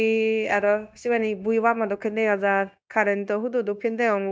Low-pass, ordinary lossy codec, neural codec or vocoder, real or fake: none; none; codec, 16 kHz, 0.9 kbps, LongCat-Audio-Codec; fake